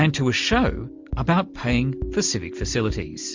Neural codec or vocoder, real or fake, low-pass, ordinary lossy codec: none; real; 7.2 kHz; MP3, 64 kbps